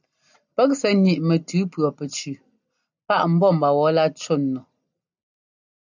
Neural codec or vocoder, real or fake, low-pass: none; real; 7.2 kHz